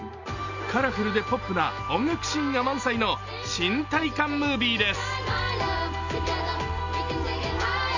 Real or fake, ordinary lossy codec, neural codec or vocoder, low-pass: real; none; none; 7.2 kHz